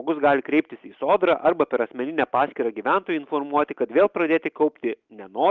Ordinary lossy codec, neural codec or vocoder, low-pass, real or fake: Opus, 32 kbps; none; 7.2 kHz; real